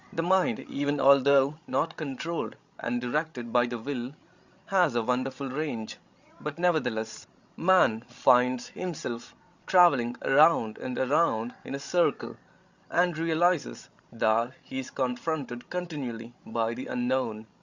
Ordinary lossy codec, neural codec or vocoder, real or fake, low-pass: Opus, 64 kbps; codec, 16 kHz, 16 kbps, FreqCodec, larger model; fake; 7.2 kHz